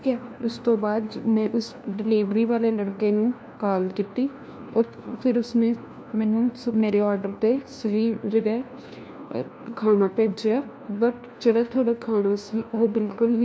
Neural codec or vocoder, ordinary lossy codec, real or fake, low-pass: codec, 16 kHz, 1 kbps, FunCodec, trained on LibriTTS, 50 frames a second; none; fake; none